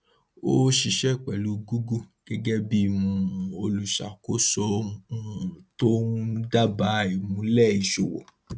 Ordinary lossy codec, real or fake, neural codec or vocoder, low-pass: none; real; none; none